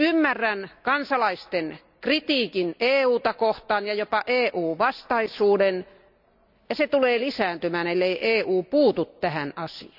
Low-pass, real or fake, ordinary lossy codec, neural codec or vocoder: 5.4 kHz; real; none; none